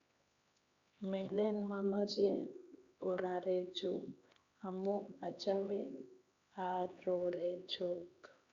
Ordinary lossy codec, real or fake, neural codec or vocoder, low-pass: Opus, 64 kbps; fake; codec, 16 kHz, 2 kbps, X-Codec, HuBERT features, trained on LibriSpeech; 7.2 kHz